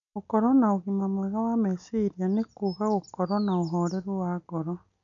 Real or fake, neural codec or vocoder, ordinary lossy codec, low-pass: real; none; none; 7.2 kHz